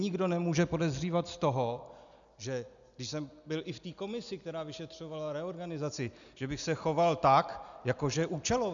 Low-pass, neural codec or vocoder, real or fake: 7.2 kHz; none; real